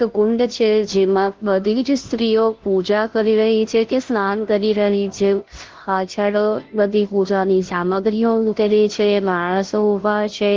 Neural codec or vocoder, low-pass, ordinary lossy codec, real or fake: codec, 16 kHz, 0.5 kbps, FunCodec, trained on Chinese and English, 25 frames a second; 7.2 kHz; Opus, 16 kbps; fake